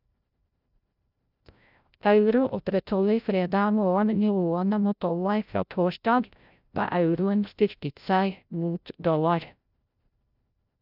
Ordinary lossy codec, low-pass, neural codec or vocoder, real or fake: none; 5.4 kHz; codec, 16 kHz, 0.5 kbps, FreqCodec, larger model; fake